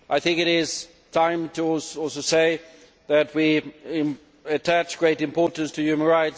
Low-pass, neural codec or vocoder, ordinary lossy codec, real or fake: none; none; none; real